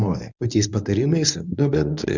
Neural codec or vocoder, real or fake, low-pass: codec, 24 kHz, 0.9 kbps, WavTokenizer, medium speech release version 1; fake; 7.2 kHz